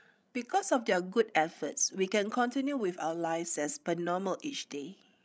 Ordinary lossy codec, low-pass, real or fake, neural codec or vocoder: none; none; fake; codec, 16 kHz, 16 kbps, FreqCodec, larger model